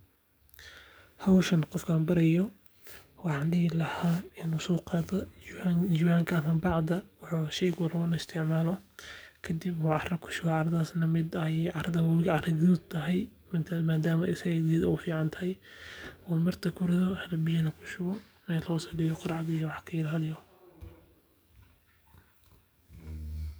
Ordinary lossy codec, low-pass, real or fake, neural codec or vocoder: none; none; fake; codec, 44.1 kHz, 7.8 kbps, DAC